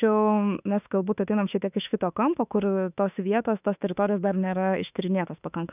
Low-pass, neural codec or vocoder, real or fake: 3.6 kHz; autoencoder, 48 kHz, 32 numbers a frame, DAC-VAE, trained on Japanese speech; fake